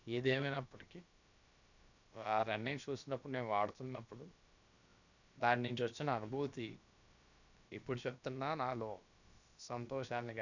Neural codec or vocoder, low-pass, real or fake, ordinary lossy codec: codec, 16 kHz, about 1 kbps, DyCAST, with the encoder's durations; 7.2 kHz; fake; none